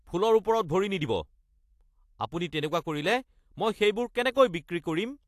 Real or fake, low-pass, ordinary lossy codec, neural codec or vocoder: real; 14.4 kHz; AAC, 64 kbps; none